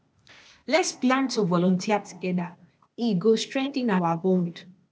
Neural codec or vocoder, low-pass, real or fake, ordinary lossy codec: codec, 16 kHz, 0.8 kbps, ZipCodec; none; fake; none